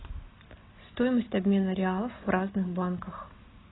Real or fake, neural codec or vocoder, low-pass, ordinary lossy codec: real; none; 7.2 kHz; AAC, 16 kbps